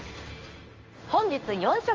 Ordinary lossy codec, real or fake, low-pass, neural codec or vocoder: Opus, 32 kbps; fake; 7.2 kHz; codec, 16 kHz in and 24 kHz out, 1 kbps, XY-Tokenizer